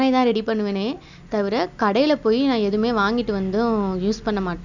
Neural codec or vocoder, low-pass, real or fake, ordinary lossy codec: none; 7.2 kHz; real; none